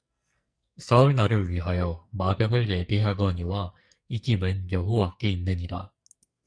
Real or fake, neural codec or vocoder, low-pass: fake; codec, 44.1 kHz, 2.6 kbps, SNAC; 9.9 kHz